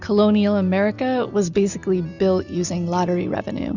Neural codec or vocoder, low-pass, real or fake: none; 7.2 kHz; real